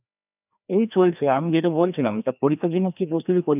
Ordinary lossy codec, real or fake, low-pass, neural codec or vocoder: none; fake; 3.6 kHz; codec, 16 kHz, 1 kbps, FreqCodec, larger model